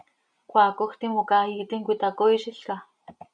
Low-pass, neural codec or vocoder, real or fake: 9.9 kHz; none; real